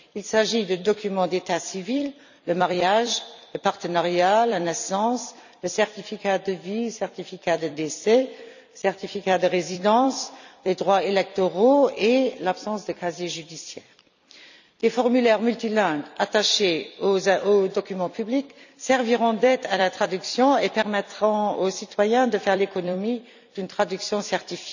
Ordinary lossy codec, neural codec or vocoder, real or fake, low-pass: none; vocoder, 44.1 kHz, 128 mel bands every 256 samples, BigVGAN v2; fake; 7.2 kHz